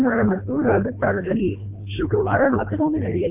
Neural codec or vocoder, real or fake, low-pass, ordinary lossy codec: codec, 24 kHz, 1.5 kbps, HILCodec; fake; 3.6 kHz; none